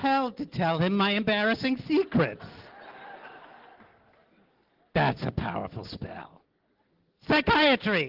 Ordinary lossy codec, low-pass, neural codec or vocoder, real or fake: Opus, 16 kbps; 5.4 kHz; none; real